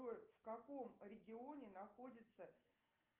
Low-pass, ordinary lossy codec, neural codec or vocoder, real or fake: 3.6 kHz; Opus, 24 kbps; none; real